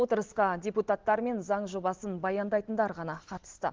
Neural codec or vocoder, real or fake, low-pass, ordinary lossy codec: none; real; 7.2 kHz; Opus, 16 kbps